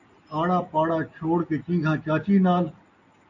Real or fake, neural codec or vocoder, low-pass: real; none; 7.2 kHz